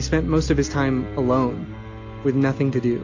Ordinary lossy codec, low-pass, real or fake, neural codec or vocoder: AAC, 48 kbps; 7.2 kHz; real; none